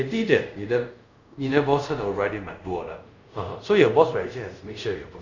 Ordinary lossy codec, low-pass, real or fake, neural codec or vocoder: none; 7.2 kHz; fake; codec, 24 kHz, 0.5 kbps, DualCodec